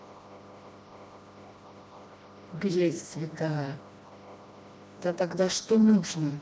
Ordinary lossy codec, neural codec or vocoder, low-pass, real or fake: none; codec, 16 kHz, 1 kbps, FreqCodec, smaller model; none; fake